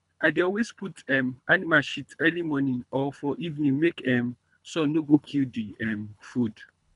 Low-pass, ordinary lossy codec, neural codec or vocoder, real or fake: 10.8 kHz; none; codec, 24 kHz, 3 kbps, HILCodec; fake